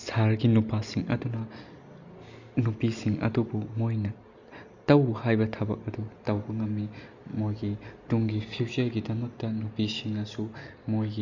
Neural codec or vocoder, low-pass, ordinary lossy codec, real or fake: none; 7.2 kHz; none; real